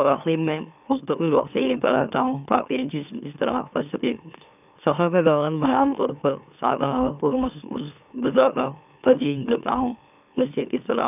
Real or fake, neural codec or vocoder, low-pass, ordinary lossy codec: fake; autoencoder, 44.1 kHz, a latent of 192 numbers a frame, MeloTTS; 3.6 kHz; none